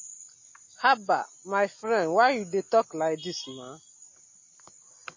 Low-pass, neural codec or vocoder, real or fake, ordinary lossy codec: 7.2 kHz; none; real; MP3, 32 kbps